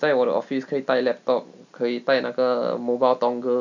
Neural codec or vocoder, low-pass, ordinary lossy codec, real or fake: none; 7.2 kHz; none; real